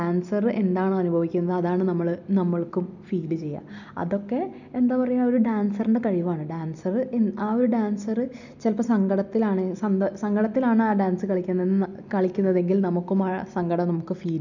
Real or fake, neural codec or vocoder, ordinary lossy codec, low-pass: real; none; none; 7.2 kHz